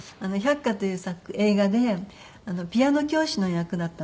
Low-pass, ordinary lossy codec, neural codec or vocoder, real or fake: none; none; none; real